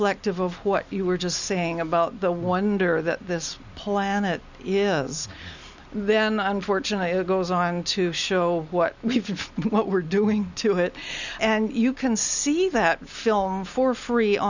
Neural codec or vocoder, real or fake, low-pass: none; real; 7.2 kHz